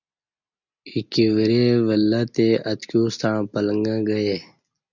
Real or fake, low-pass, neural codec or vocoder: real; 7.2 kHz; none